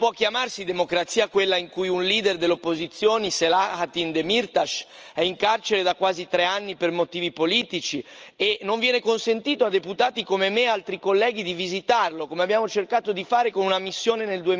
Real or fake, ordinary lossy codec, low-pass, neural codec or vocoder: real; Opus, 24 kbps; 7.2 kHz; none